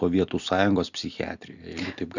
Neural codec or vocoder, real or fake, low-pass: none; real; 7.2 kHz